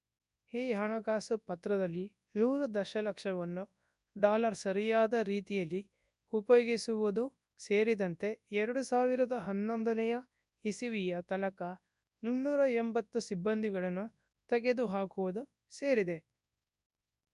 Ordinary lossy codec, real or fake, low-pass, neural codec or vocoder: none; fake; 10.8 kHz; codec, 24 kHz, 0.9 kbps, WavTokenizer, large speech release